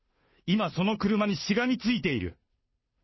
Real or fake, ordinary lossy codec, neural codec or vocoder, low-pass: fake; MP3, 24 kbps; codec, 16 kHz, 2 kbps, FunCodec, trained on Chinese and English, 25 frames a second; 7.2 kHz